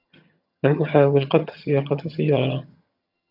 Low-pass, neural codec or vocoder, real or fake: 5.4 kHz; vocoder, 22.05 kHz, 80 mel bands, HiFi-GAN; fake